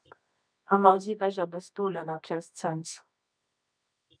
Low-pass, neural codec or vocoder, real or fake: 9.9 kHz; codec, 24 kHz, 0.9 kbps, WavTokenizer, medium music audio release; fake